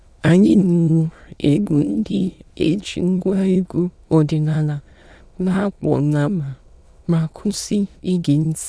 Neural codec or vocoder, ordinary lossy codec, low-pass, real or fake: autoencoder, 22.05 kHz, a latent of 192 numbers a frame, VITS, trained on many speakers; none; none; fake